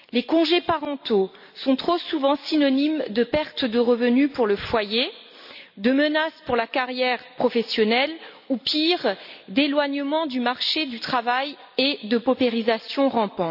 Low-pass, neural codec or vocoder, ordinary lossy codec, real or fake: 5.4 kHz; none; none; real